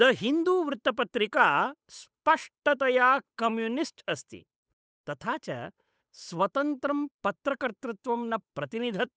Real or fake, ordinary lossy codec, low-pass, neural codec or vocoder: fake; none; none; codec, 16 kHz, 8 kbps, FunCodec, trained on Chinese and English, 25 frames a second